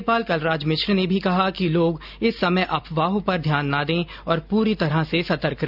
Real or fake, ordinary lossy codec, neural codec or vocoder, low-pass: real; none; none; 5.4 kHz